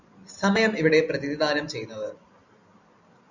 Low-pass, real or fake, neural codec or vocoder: 7.2 kHz; real; none